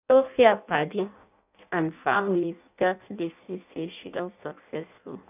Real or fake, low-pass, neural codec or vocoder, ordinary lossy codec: fake; 3.6 kHz; codec, 16 kHz in and 24 kHz out, 0.6 kbps, FireRedTTS-2 codec; none